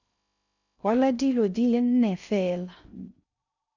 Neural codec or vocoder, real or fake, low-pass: codec, 16 kHz in and 24 kHz out, 0.6 kbps, FocalCodec, streaming, 2048 codes; fake; 7.2 kHz